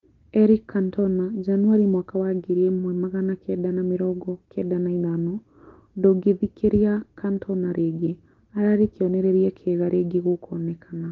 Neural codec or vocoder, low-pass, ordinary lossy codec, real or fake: none; 7.2 kHz; Opus, 16 kbps; real